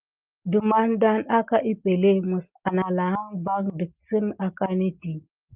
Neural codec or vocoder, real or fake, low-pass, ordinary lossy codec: none; real; 3.6 kHz; Opus, 24 kbps